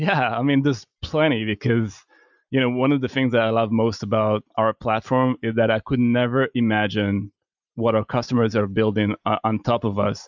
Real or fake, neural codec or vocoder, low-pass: real; none; 7.2 kHz